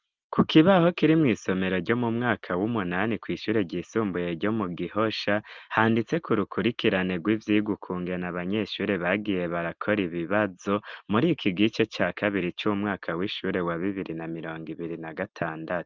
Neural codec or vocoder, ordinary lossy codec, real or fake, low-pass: none; Opus, 32 kbps; real; 7.2 kHz